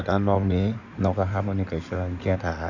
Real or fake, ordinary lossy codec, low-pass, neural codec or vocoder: fake; none; 7.2 kHz; codec, 16 kHz in and 24 kHz out, 2.2 kbps, FireRedTTS-2 codec